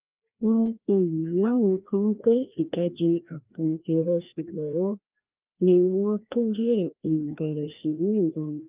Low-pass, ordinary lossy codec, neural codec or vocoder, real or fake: 3.6 kHz; Opus, 24 kbps; codec, 16 kHz, 1 kbps, FreqCodec, larger model; fake